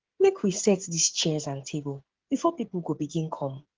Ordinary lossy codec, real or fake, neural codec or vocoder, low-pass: Opus, 16 kbps; fake; codec, 16 kHz, 8 kbps, FreqCodec, smaller model; 7.2 kHz